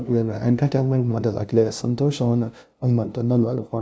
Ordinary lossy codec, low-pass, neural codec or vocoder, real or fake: none; none; codec, 16 kHz, 0.5 kbps, FunCodec, trained on LibriTTS, 25 frames a second; fake